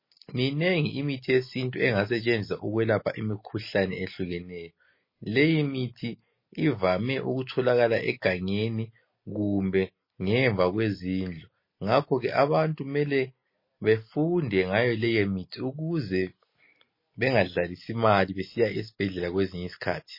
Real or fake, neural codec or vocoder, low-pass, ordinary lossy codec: real; none; 5.4 kHz; MP3, 24 kbps